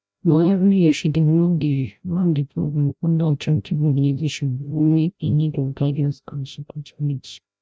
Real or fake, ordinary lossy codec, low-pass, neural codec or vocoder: fake; none; none; codec, 16 kHz, 0.5 kbps, FreqCodec, larger model